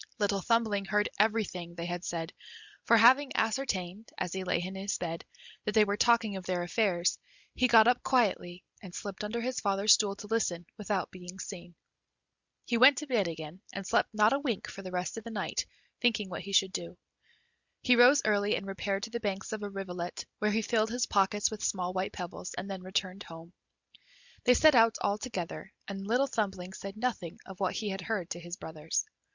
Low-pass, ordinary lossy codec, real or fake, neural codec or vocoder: 7.2 kHz; Opus, 64 kbps; real; none